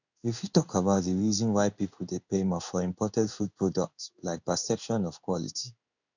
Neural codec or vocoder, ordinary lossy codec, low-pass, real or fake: codec, 16 kHz in and 24 kHz out, 1 kbps, XY-Tokenizer; none; 7.2 kHz; fake